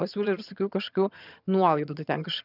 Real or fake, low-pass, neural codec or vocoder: fake; 5.4 kHz; vocoder, 22.05 kHz, 80 mel bands, HiFi-GAN